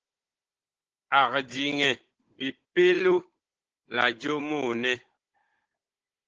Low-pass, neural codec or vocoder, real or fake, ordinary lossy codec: 7.2 kHz; codec, 16 kHz, 16 kbps, FunCodec, trained on Chinese and English, 50 frames a second; fake; Opus, 16 kbps